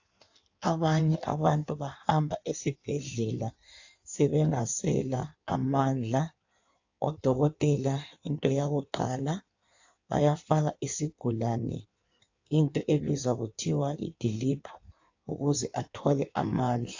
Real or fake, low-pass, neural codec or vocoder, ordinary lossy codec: fake; 7.2 kHz; codec, 16 kHz in and 24 kHz out, 1.1 kbps, FireRedTTS-2 codec; AAC, 48 kbps